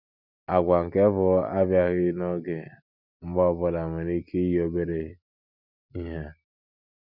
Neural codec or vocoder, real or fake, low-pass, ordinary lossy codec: none; real; 5.4 kHz; none